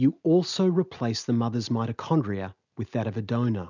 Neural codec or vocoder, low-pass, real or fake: none; 7.2 kHz; real